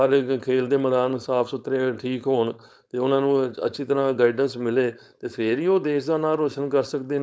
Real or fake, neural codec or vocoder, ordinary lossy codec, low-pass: fake; codec, 16 kHz, 4.8 kbps, FACodec; none; none